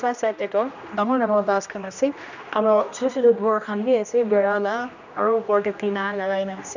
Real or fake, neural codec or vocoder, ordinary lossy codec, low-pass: fake; codec, 16 kHz, 1 kbps, X-Codec, HuBERT features, trained on general audio; none; 7.2 kHz